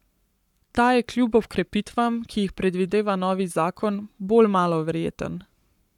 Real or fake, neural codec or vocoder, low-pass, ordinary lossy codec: fake; codec, 44.1 kHz, 7.8 kbps, Pupu-Codec; 19.8 kHz; none